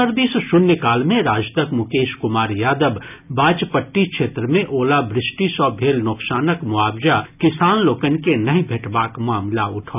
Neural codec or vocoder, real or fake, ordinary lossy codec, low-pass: none; real; none; 3.6 kHz